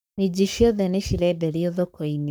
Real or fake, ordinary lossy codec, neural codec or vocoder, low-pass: fake; none; codec, 44.1 kHz, 7.8 kbps, Pupu-Codec; none